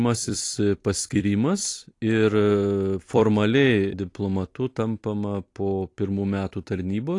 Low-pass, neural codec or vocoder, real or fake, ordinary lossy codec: 10.8 kHz; vocoder, 44.1 kHz, 128 mel bands every 256 samples, BigVGAN v2; fake; AAC, 48 kbps